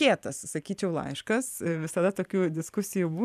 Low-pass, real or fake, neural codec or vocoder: 14.4 kHz; fake; vocoder, 44.1 kHz, 128 mel bands every 512 samples, BigVGAN v2